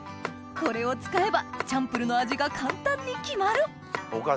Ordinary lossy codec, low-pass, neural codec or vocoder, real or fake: none; none; none; real